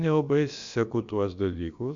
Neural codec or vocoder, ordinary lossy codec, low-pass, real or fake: codec, 16 kHz, about 1 kbps, DyCAST, with the encoder's durations; Opus, 64 kbps; 7.2 kHz; fake